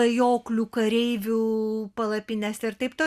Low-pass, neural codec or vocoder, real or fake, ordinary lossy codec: 14.4 kHz; none; real; Opus, 64 kbps